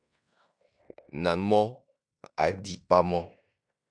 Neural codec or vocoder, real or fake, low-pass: codec, 16 kHz in and 24 kHz out, 0.9 kbps, LongCat-Audio-Codec, fine tuned four codebook decoder; fake; 9.9 kHz